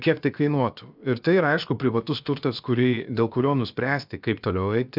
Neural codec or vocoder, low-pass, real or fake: codec, 16 kHz, about 1 kbps, DyCAST, with the encoder's durations; 5.4 kHz; fake